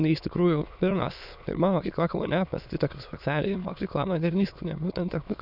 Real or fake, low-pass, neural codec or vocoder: fake; 5.4 kHz; autoencoder, 22.05 kHz, a latent of 192 numbers a frame, VITS, trained on many speakers